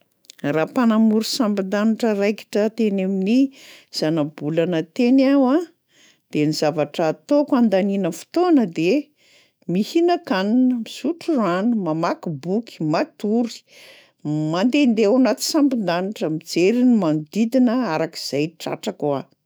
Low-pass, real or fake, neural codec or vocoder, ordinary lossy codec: none; fake; autoencoder, 48 kHz, 128 numbers a frame, DAC-VAE, trained on Japanese speech; none